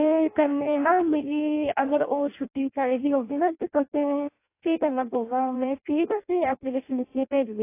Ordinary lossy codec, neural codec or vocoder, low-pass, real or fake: AAC, 24 kbps; codec, 16 kHz in and 24 kHz out, 0.6 kbps, FireRedTTS-2 codec; 3.6 kHz; fake